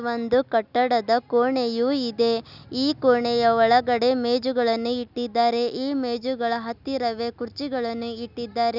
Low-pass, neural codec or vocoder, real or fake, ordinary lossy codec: 5.4 kHz; none; real; none